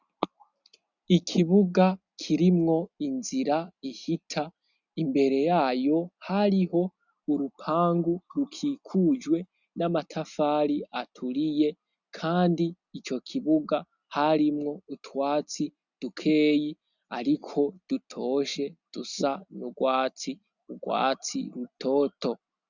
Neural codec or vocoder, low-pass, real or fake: none; 7.2 kHz; real